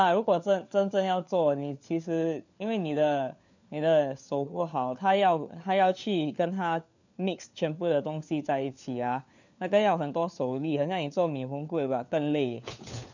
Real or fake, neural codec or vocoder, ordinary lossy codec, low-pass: fake; codec, 16 kHz, 4 kbps, FunCodec, trained on LibriTTS, 50 frames a second; none; 7.2 kHz